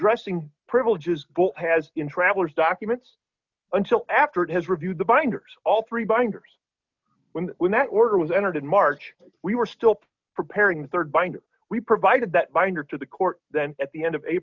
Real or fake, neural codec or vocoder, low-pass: real; none; 7.2 kHz